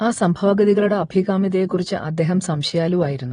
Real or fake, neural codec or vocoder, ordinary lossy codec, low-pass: fake; vocoder, 22.05 kHz, 80 mel bands, Vocos; AAC, 32 kbps; 9.9 kHz